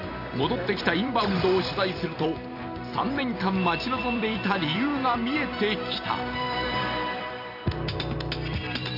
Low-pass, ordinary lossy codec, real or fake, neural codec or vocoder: 5.4 kHz; none; fake; vocoder, 44.1 kHz, 128 mel bands every 256 samples, BigVGAN v2